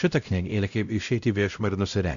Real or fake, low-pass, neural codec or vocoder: fake; 7.2 kHz; codec, 16 kHz, 0.5 kbps, X-Codec, WavLM features, trained on Multilingual LibriSpeech